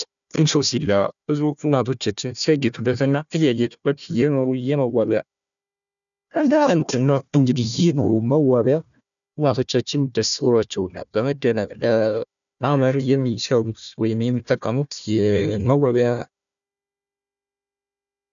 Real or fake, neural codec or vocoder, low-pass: fake; codec, 16 kHz, 1 kbps, FunCodec, trained on Chinese and English, 50 frames a second; 7.2 kHz